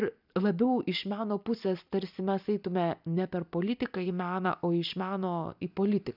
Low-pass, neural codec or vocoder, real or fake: 5.4 kHz; none; real